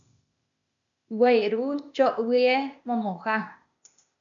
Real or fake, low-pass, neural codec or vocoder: fake; 7.2 kHz; codec, 16 kHz, 0.8 kbps, ZipCodec